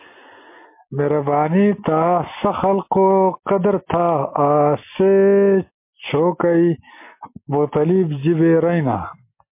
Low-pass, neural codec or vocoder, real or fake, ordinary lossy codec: 3.6 kHz; none; real; MP3, 24 kbps